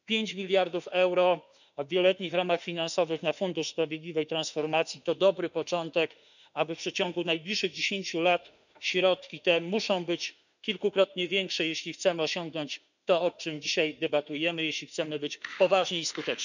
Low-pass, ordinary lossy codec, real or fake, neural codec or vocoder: 7.2 kHz; none; fake; autoencoder, 48 kHz, 32 numbers a frame, DAC-VAE, trained on Japanese speech